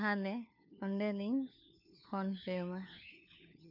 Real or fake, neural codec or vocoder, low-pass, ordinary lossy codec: fake; codec, 16 kHz, 4 kbps, FunCodec, trained on LibriTTS, 50 frames a second; 5.4 kHz; none